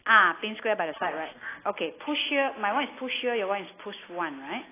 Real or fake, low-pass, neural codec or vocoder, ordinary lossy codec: real; 3.6 kHz; none; AAC, 16 kbps